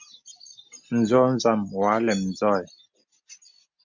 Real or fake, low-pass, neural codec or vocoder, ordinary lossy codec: real; 7.2 kHz; none; MP3, 64 kbps